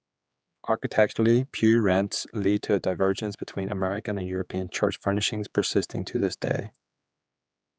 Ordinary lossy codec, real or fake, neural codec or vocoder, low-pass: none; fake; codec, 16 kHz, 4 kbps, X-Codec, HuBERT features, trained on general audio; none